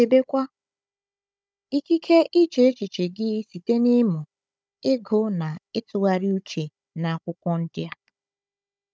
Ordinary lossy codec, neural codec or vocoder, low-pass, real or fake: none; codec, 16 kHz, 16 kbps, FunCodec, trained on Chinese and English, 50 frames a second; none; fake